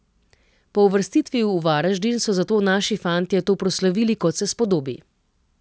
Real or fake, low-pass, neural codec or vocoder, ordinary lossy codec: real; none; none; none